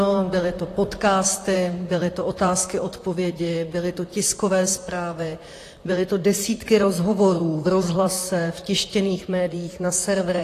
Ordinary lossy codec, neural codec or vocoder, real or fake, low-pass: AAC, 48 kbps; vocoder, 44.1 kHz, 128 mel bands, Pupu-Vocoder; fake; 14.4 kHz